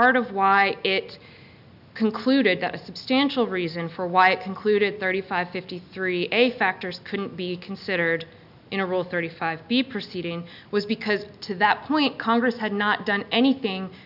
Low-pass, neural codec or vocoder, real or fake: 5.4 kHz; none; real